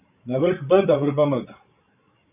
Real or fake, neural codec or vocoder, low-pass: fake; codec, 16 kHz, 8 kbps, FreqCodec, larger model; 3.6 kHz